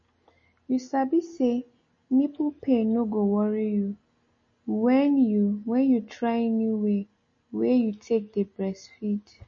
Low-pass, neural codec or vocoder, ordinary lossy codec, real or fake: 7.2 kHz; none; MP3, 32 kbps; real